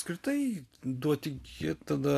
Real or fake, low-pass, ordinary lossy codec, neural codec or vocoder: real; 14.4 kHz; AAC, 64 kbps; none